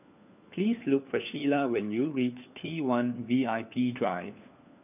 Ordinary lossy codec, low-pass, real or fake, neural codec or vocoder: none; 3.6 kHz; fake; codec, 16 kHz, 4 kbps, FunCodec, trained on LibriTTS, 50 frames a second